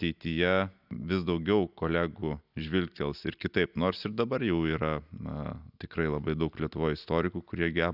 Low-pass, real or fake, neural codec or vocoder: 5.4 kHz; real; none